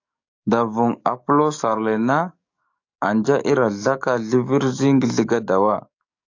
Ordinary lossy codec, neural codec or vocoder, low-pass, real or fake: AAC, 48 kbps; codec, 44.1 kHz, 7.8 kbps, DAC; 7.2 kHz; fake